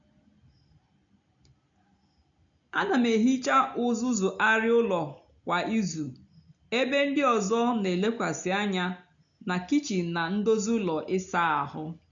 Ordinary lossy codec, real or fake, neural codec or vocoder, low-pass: MP3, 64 kbps; real; none; 7.2 kHz